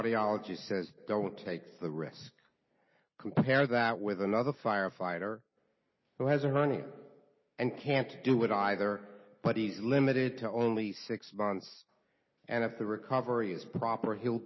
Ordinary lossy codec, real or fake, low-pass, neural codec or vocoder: MP3, 24 kbps; real; 7.2 kHz; none